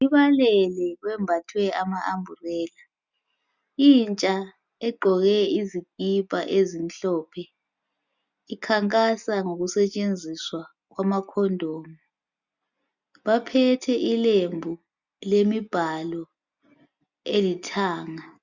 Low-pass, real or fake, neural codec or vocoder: 7.2 kHz; real; none